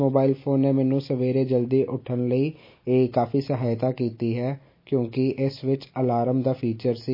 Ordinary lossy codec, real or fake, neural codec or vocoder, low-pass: MP3, 24 kbps; real; none; 5.4 kHz